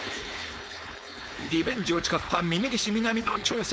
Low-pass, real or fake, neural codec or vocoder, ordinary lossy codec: none; fake; codec, 16 kHz, 4.8 kbps, FACodec; none